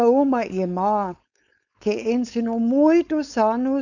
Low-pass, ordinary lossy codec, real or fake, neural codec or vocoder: 7.2 kHz; none; fake; codec, 16 kHz, 4.8 kbps, FACodec